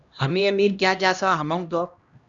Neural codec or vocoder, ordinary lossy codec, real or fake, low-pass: codec, 16 kHz, 1 kbps, X-Codec, HuBERT features, trained on LibriSpeech; Opus, 64 kbps; fake; 7.2 kHz